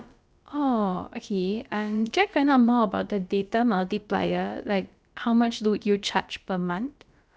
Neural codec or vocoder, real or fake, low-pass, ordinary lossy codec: codec, 16 kHz, about 1 kbps, DyCAST, with the encoder's durations; fake; none; none